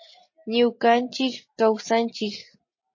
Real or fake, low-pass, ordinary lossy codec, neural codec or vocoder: real; 7.2 kHz; MP3, 32 kbps; none